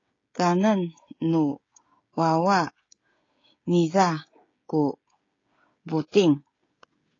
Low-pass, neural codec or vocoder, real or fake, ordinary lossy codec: 7.2 kHz; codec, 16 kHz, 16 kbps, FreqCodec, smaller model; fake; AAC, 32 kbps